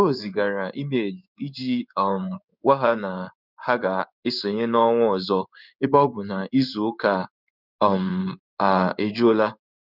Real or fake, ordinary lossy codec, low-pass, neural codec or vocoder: fake; none; 5.4 kHz; codec, 16 kHz in and 24 kHz out, 1 kbps, XY-Tokenizer